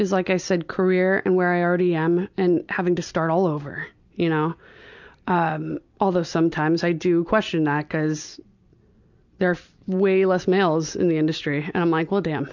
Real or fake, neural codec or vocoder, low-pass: real; none; 7.2 kHz